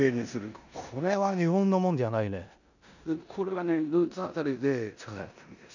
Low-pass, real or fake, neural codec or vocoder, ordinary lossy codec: 7.2 kHz; fake; codec, 16 kHz in and 24 kHz out, 0.9 kbps, LongCat-Audio-Codec, four codebook decoder; none